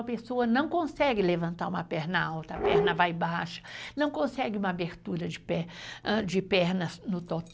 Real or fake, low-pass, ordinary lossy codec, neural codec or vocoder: real; none; none; none